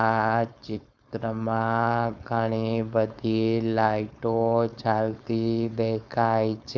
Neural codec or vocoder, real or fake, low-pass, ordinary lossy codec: codec, 16 kHz, 4.8 kbps, FACodec; fake; none; none